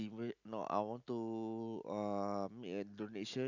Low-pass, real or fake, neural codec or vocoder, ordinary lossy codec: 7.2 kHz; real; none; none